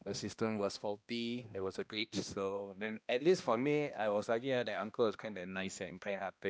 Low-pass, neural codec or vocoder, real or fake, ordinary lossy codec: none; codec, 16 kHz, 1 kbps, X-Codec, HuBERT features, trained on balanced general audio; fake; none